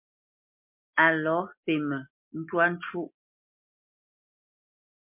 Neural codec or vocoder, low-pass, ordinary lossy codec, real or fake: none; 3.6 kHz; MP3, 32 kbps; real